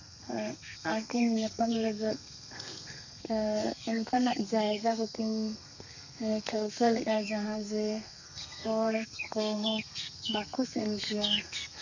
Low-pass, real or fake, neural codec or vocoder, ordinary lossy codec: 7.2 kHz; fake; codec, 32 kHz, 1.9 kbps, SNAC; none